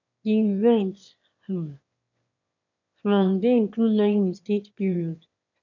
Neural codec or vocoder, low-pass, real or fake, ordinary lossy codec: autoencoder, 22.05 kHz, a latent of 192 numbers a frame, VITS, trained on one speaker; 7.2 kHz; fake; none